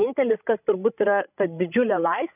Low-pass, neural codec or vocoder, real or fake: 3.6 kHz; codec, 16 kHz, 16 kbps, FreqCodec, larger model; fake